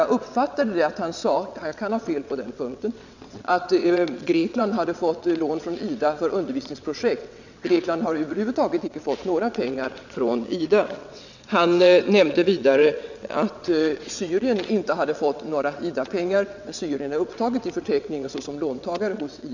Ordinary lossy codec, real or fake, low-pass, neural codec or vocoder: none; fake; 7.2 kHz; vocoder, 22.05 kHz, 80 mel bands, WaveNeXt